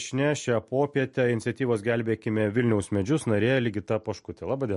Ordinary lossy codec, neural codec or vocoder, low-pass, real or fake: MP3, 48 kbps; none; 14.4 kHz; real